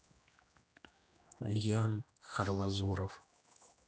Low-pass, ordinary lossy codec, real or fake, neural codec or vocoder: none; none; fake; codec, 16 kHz, 1 kbps, X-Codec, HuBERT features, trained on general audio